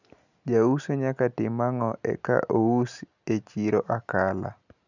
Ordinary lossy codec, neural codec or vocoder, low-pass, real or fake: none; none; 7.2 kHz; real